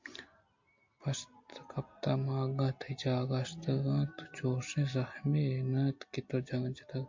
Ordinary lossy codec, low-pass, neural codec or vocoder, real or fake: MP3, 48 kbps; 7.2 kHz; none; real